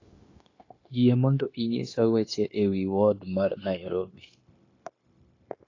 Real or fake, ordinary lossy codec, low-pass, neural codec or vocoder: fake; AAC, 32 kbps; 7.2 kHz; codec, 16 kHz, 0.9 kbps, LongCat-Audio-Codec